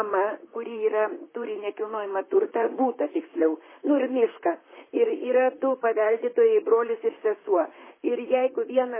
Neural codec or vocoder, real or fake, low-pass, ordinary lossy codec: vocoder, 44.1 kHz, 128 mel bands, Pupu-Vocoder; fake; 3.6 kHz; MP3, 16 kbps